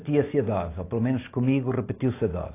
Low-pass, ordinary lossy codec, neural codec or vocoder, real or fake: 3.6 kHz; AAC, 24 kbps; none; real